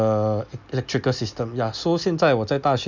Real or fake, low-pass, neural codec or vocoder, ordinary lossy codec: real; 7.2 kHz; none; none